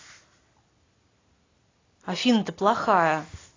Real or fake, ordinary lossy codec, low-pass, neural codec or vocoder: real; AAC, 32 kbps; 7.2 kHz; none